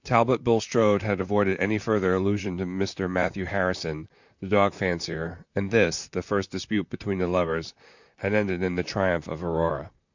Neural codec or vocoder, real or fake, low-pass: vocoder, 44.1 kHz, 128 mel bands, Pupu-Vocoder; fake; 7.2 kHz